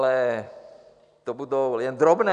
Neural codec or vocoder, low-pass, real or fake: none; 9.9 kHz; real